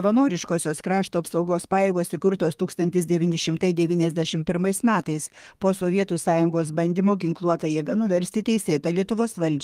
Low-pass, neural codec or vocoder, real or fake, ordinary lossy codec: 14.4 kHz; codec, 32 kHz, 1.9 kbps, SNAC; fake; Opus, 32 kbps